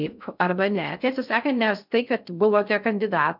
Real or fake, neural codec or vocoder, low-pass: fake; codec, 16 kHz in and 24 kHz out, 0.6 kbps, FocalCodec, streaming, 2048 codes; 5.4 kHz